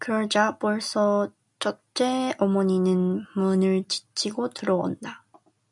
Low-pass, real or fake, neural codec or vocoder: 10.8 kHz; real; none